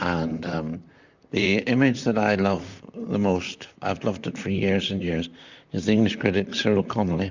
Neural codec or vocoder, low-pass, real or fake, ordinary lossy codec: vocoder, 44.1 kHz, 128 mel bands, Pupu-Vocoder; 7.2 kHz; fake; Opus, 64 kbps